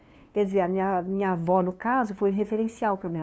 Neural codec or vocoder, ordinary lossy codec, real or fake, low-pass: codec, 16 kHz, 2 kbps, FunCodec, trained on LibriTTS, 25 frames a second; none; fake; none